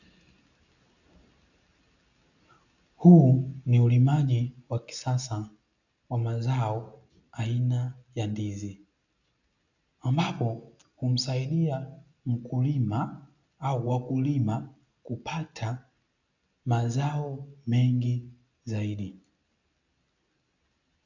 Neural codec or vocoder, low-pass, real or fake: none; 7.2 kHz; real